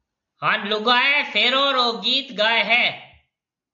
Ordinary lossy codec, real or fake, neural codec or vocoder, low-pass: AAC, 64 kbps; real; none; 7.2 kHz